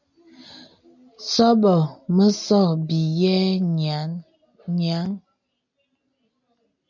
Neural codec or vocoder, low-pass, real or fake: none; 7.2 kHz; real